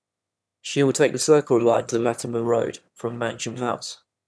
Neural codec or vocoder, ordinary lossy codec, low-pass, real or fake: autoencoder, 22.05 kHz, a latent of 192 numbers a frame, VITS, trained on one speaker; none; none; fake